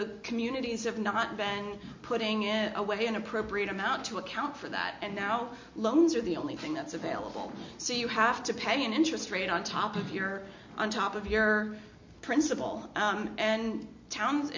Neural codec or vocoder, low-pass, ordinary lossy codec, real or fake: none; 7.2 kHz; MP3, 48 kbps; real